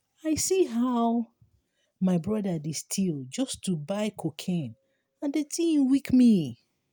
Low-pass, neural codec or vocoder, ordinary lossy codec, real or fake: none; none; none; real